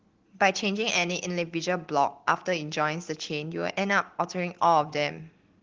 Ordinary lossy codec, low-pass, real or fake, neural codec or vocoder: Opus, 16 kbps; 7.2 kHz; real; none